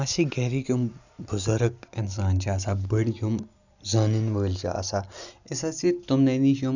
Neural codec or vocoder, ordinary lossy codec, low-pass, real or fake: none; none; 7.2 kHz; real